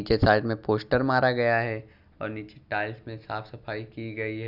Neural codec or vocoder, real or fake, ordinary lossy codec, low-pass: none; real; none; 5.4 kHz